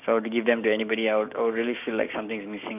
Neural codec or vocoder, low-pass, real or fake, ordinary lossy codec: codec, 16 kHz, 6 kbps, DAC; 3.6 kHz; fake; none